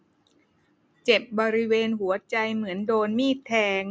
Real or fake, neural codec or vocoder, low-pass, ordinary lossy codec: real; none; none; none